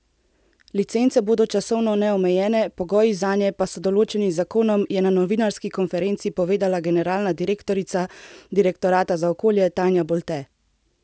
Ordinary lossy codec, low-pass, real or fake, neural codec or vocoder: none; none; real; none